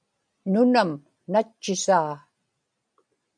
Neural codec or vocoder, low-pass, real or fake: none; 9.9 kHz; real